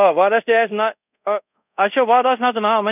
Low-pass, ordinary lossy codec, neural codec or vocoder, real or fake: 3.6 kHz; none; codec, 24 kHz, 0.5 kbps, DualCodec; fake